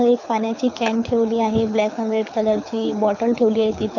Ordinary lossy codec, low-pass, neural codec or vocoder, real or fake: none; 7.2 kHz; codec, 24 kHz, 6 kbps, HILCodec; fake